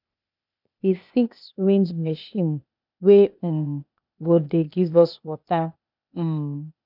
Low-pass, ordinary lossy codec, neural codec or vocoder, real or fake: 5.4 kHz; none; codec, 16 kHz, 0.8 kbps, ZipCodec; fake